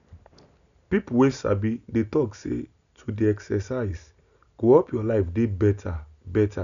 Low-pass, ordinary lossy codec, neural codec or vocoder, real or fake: 7.2 kHz; none; none; real